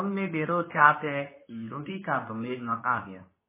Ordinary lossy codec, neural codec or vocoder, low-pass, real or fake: MP3, 16 kbps; codec, 24 kHz, 0.9 kbps, WavTokenizer, medium speech release version 2; 3.6 kHz; fake